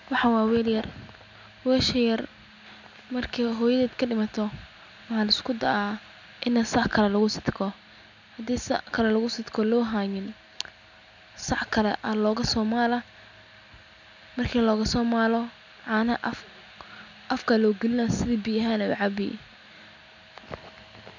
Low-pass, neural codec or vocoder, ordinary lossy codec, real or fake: 7.2 kHz; none; none; real